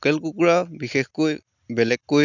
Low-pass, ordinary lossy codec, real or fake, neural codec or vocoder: 7.2 kHz; none; real; none